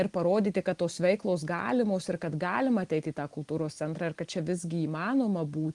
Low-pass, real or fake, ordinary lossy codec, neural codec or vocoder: 10.8 kHz; real; Opus, 24 kbps; none